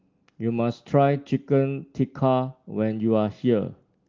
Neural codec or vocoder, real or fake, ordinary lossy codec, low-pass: none; real; Opus, 32 kbps; 7.2 kHz